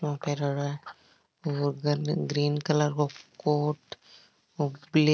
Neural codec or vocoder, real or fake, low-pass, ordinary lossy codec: none; real; none; none